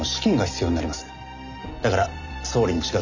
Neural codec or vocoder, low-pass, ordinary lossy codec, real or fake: none; 7.2 kHz; none; real